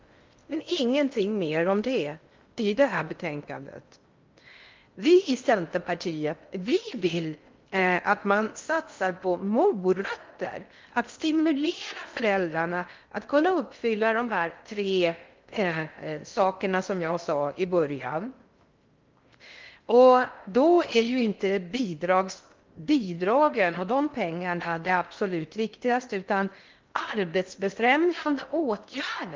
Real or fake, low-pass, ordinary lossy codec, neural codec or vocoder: fake; 7.2 kHz; Opus, 24 kbps; codec, 16 kHz in and 24 kHz out, 0.6 kbps, FocalCodec, streaming, 2048 codes